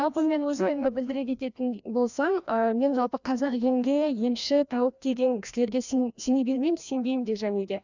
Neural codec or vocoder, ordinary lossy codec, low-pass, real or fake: codec, 16 kHz, 1 kbps, FreqCodec, larger model; none; 7.2 kHz; fake